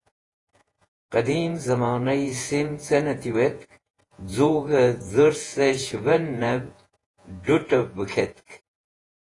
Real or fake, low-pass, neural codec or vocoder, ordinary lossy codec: fake; 10.8 kHz; vocoder, 48 kHz, 128 mel bands, Vocos; AAC, 32 kbps